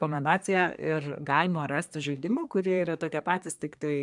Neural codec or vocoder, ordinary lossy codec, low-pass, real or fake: codec, 24 kHz, 1 kbps, SNAC; MP3, 96 kbps; 10.8 kHz; fake